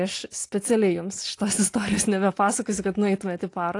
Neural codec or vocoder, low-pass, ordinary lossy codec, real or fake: none; 10.8 kHz; AAC, 48 kbps; real